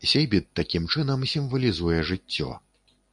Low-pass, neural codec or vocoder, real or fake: 10.8 kHz; none; real